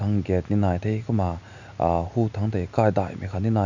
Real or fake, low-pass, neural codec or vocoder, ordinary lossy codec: real; 7.2 kHz; none; none